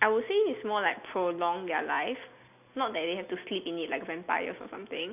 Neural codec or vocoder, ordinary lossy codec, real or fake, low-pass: none; none; real; 3.6 kHz